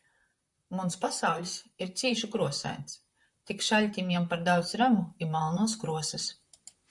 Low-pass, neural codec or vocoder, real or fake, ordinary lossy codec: 10.8 kHz; vocoder, 44.1 kHz, 128 mel bands, Pupu-Vocoder; fake; MP3, 96 kbps